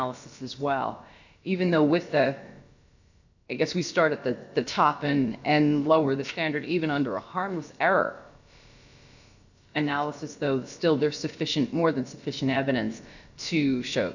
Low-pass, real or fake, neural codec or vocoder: 7.2 kHz; fake; codec, 16 kHz, about 1 kbps, DyCAST, with the encoder's durations